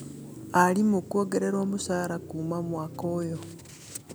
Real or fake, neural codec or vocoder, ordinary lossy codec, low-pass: real; none; none; none